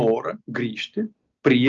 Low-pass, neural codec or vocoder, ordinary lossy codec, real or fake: 7.2 kHz; none; Opus, 24 kbps; real